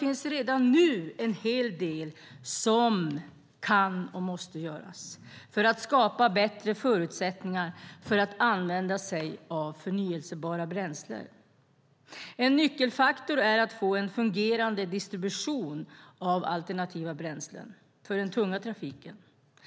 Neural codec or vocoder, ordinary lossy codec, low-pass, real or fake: none; none; none; real